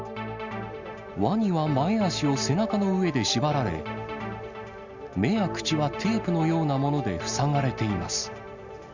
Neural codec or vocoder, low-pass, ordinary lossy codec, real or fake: none; 7.2 kHz; Opus, 64 kbps; real